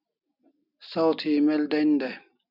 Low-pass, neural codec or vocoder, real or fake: 5.4 kHz; none; real